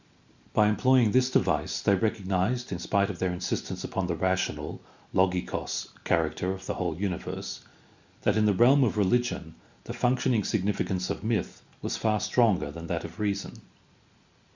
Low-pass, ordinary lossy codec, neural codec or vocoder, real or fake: 7.2 kHz; Opus, 64 kbps; none; real